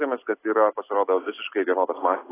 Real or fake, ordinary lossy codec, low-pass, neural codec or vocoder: real; AAC, 16 kbps; 3.6 kHz; none